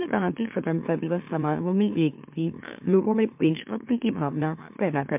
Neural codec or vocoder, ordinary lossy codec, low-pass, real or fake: autoencoder, 44.1 kHz, a latent of 192 numbers a frame, MeloTTS; MP3, 32 kbps; 3.6 kHz; fake